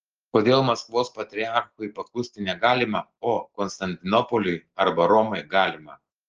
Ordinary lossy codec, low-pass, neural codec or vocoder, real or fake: Opus, 16 kbps; 7.2 kHz; none; real